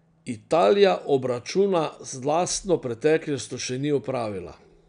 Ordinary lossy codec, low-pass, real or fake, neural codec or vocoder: none; 9.9 kHz; real; none